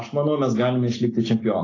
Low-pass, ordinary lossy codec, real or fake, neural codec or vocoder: 7.2 kHz; AAC, 32 kbps; real; none